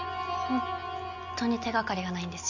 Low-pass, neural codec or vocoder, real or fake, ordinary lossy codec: 7.2 kHz; none; real; none